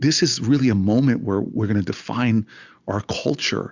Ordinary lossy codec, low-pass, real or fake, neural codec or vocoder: Opus, 64 kbps; 7.2 kHz; real; none